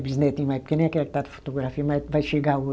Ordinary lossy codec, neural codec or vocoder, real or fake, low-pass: none; none; real; none